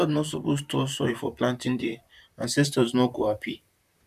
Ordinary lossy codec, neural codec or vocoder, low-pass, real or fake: none; vocoder, 44.1 kHz, 128 mel bands, Pupu-Vocoder; 14.4 kHz; fake